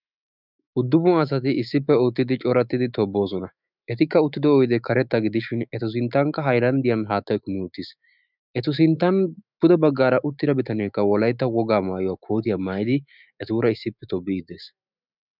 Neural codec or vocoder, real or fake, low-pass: autoencoder, 48 kHz, 128 numbers a frame, DAC-VAE, trained on Japanese speech; fake; 5.4 kHz